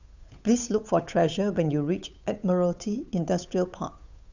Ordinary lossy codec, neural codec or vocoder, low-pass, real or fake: none; codec, 16 kHz, 8 kbps, FunCodec, trained on Chinese and English, 25 frames a second; 7.2 kHz; fake